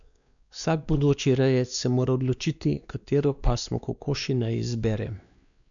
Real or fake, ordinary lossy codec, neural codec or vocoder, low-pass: fake; none; codec, 16 kHz, 2 kbps, X-Codec, WavLM features, trained on Multilingual LibriSpeech; 7.2 kHz